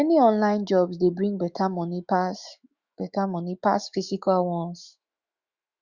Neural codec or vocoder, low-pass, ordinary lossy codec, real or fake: autoencoder, 48 kHz, 128 numbers a frame, DAC-VAE, trained on Japanese speech; 7.2 kHz; Opus, 64 kbps; fake